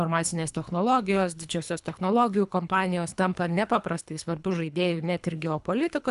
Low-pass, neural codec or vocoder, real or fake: 10.8 kHz; codec, 24 kHz, 3 kbps, HILCodec; fake